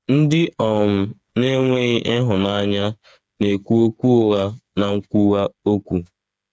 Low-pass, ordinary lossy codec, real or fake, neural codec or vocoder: none; none; fake; codec, 16 kHz, 8 kbps, FreqCodec, smaller model